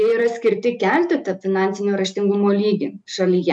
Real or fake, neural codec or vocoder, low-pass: real; none; 10.8 kHz